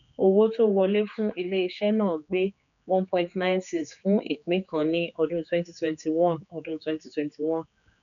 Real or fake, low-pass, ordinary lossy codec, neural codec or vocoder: fake; 7.2 kHz; none; codec, 16 kHz, 4 kbps, X-Codec, HuBERT features, trained on general audio